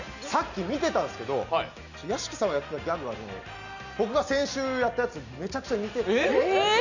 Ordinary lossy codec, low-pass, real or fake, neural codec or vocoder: none; 7.2 kHz; real; none